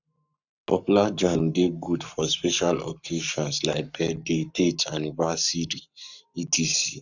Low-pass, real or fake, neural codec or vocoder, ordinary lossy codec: 7.2 kHz; fake; codec, 44.1 kHz, 7.8 kbps, Pupu-Codec; none